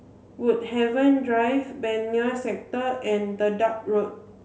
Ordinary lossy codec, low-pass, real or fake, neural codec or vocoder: none; none; real; none